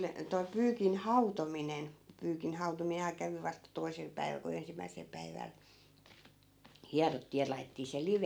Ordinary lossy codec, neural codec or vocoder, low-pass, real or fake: none; none; none; real